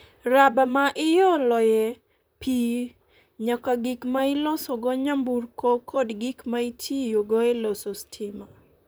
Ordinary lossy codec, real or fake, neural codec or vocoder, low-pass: none; fake; vocoder, 44.1 kHz, 128 mel bands, Pupu-Vocoder; none